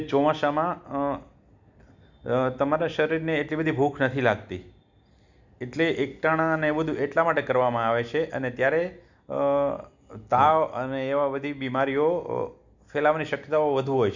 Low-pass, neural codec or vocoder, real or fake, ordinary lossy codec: 7.2 kHz; none; real; none